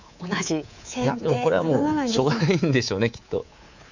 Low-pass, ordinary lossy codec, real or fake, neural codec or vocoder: 7.2 kHz; none; fake; codec, 24 kHz, 3.1 kbps, DualCodec